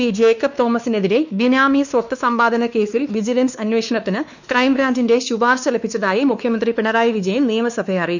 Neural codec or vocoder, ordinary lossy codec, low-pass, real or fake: codec, 16 kHz, 2 kbps, X-Codec, WavLM features, trained on Multilingual LibriSpeech; none; 7.2 kHz; fake